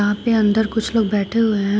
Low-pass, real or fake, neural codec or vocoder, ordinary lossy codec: none; real; none; none